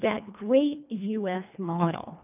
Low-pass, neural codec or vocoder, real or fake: 3.6 kHz; codec, 24 kHz, 1.5 kbps, HILCodec; fake